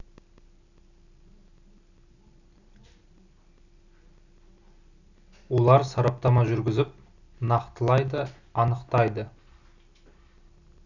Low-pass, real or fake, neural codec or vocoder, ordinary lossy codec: 7.2 kHz; real; none; none